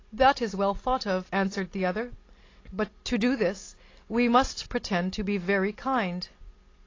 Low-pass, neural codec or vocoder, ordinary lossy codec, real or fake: 7.2 kHz; none; AAC, 32 kbps; real